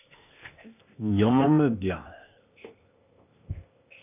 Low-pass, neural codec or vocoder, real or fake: 3.6 kHz; codec, 16 kHz, 0.8 kbps, ZipCodec; fake